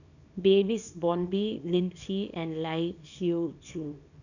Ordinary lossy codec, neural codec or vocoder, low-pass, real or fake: none; codec, 24 kHz, 0.9 kbps, WavTokenizer, small release; 7.2 kHz; fake